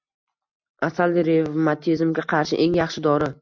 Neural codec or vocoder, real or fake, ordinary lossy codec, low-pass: none; real; MP3, 64 kbps; 7.2 kHz